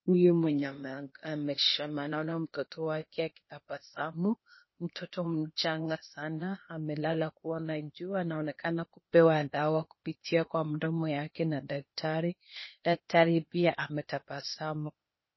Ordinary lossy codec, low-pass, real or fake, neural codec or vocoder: MP3, 24 kbps; 7.2 kHz; fake; codec, 16 kHz, 0.8 kbps, ZipCodec